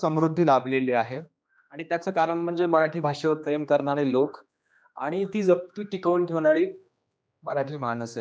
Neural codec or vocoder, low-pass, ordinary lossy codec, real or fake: codec, 16 kHz, 2 kbps, X-Codec, HuBERT features, trained on general audio; none; none; fake